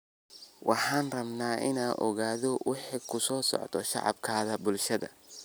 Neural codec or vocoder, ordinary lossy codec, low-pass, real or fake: none; none; none; real